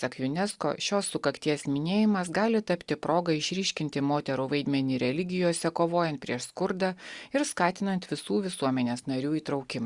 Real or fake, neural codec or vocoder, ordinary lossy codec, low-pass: real; none; Opus, 64 kbps; 10.8 kHz